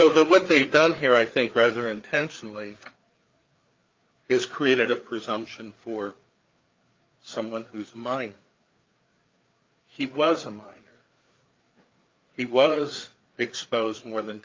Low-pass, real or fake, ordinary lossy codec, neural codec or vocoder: 7.2 kHz; fake; Opus, 32 kbps; codec, 16 kHz, 4 kbps, FreqCodec, larger model